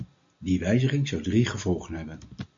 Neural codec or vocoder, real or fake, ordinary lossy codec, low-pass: none; real; MP3, 48 kbps; 7.2 kHz